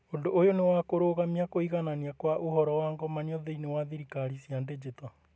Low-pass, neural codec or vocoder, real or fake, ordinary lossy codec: none; none; real; none